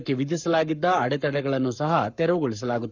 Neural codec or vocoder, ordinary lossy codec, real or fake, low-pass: codec, 44.1 kHz, 7.8 kbps, Pupu-Codec; none; fake; 7.2 kHz